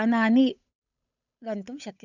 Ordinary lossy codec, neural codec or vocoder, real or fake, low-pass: none; codec, 16 kHz, 8 kbps, FunCodec, trained on Chinese and English, 25 frames a second; fake; 7.2 kHz